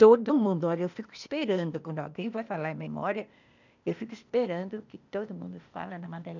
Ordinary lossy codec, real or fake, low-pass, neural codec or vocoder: none; fake; 7.2 kHz; codec, 16 kHz, 0.8 kbps, ZipCodec